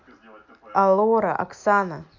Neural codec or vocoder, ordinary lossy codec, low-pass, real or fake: none; AAC, 48 kbps; 7.2 kHz; real